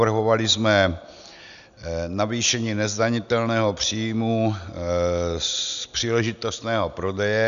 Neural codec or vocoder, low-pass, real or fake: none; 7.2 kHz; real